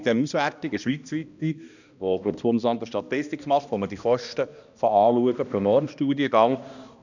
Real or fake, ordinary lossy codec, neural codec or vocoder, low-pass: fake; none; codec, 16 kHz, 1 kbps, X-Codec, HuBERT features, trained on balanced general audio; 7.2 kHz